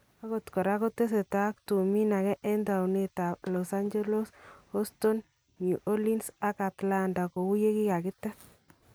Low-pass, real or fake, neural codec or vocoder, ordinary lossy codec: none; real; none; none